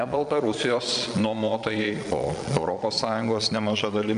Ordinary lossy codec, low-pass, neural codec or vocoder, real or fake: AAC, 96 kbps; 9.9 kHz; vocoder, 22.05 kHz, 80 mel bands, Vocos; fake